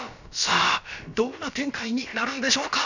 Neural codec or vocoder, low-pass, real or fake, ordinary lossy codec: codec, 16 kHz, about 1 kbps, DyCAST, with the encoder's durations; 7.2 kHz; fake; none